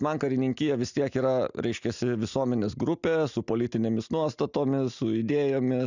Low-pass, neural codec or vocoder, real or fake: 7.2 kHz; none; real